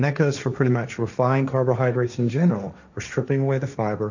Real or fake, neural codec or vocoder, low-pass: fake; codec, 16 kHz, 1.1 kbps, Voila-Tokenizer; 7.2 kHz